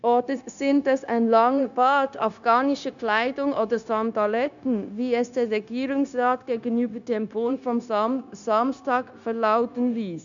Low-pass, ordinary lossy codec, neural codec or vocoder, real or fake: 7.2 kHz; none; codec, 16 kHz, 0.9 kbps, LongCat-Audio-Codec; fake